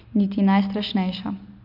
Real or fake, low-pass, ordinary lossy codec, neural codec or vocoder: real; 5.4 kHz; none; none